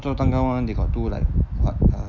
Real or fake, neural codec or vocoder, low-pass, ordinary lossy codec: real; none; 7.2 kHz; none